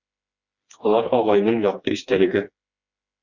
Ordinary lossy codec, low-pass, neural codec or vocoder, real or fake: Opus, 64 kbps; 7.2 kHz; codec, 16 kHz, 2 kbps, FreqCodec, smaller model; fake